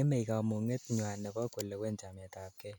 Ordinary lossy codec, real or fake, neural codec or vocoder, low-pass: none; real; none; none